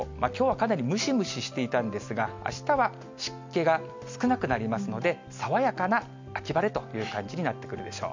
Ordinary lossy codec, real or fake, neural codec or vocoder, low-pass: MP3, 64 kbps; real; none; 7.2 kHz